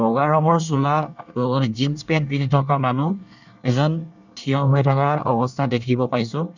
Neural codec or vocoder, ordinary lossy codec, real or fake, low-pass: codec, 24 kHz, 1 kbps, SNAC; none; fake; 7.2 kHz